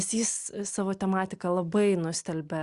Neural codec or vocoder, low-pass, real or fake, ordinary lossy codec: none; 10.8 kHz; real; Opus, 64 kbps